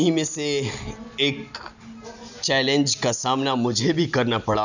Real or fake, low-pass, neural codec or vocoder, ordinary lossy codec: real; 7.2 kHz; none; none